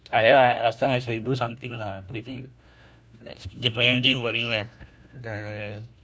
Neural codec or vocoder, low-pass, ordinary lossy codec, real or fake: codec, 16 kHz, 1 kbps, FunCodec, trained on LibriTTS, 50 frames a second; none; none; fake